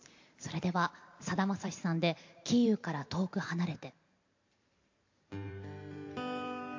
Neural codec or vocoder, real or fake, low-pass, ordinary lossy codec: vocoder, 44.1 kHz, 128 mel bands every 512 samples, BigVGAN v2; fake; 7.2 kHz; MP3, 48 kbps